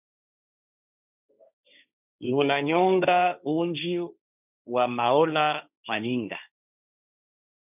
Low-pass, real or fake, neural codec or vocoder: 3.6 kHz; fake; codec, 16 kHz, 1.1 kbps, Voila-Tokenizer